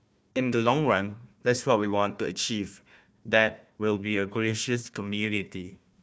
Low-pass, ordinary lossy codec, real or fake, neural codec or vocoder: none; none; fake; codec, 16 kHz, 1 kbps, FunCodec, trained on Chinese and English, 50 frames a second